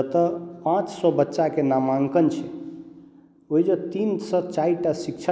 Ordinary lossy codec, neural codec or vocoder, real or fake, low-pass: none; none; real; none